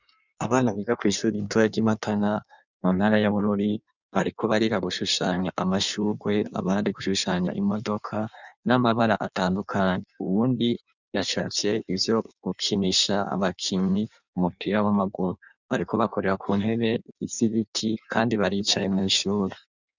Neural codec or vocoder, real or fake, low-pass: codec, 16 kHz in and 24 kHz out, 1.1 kbps, FireRedTTS-2 codec; fake; 7.2 kHz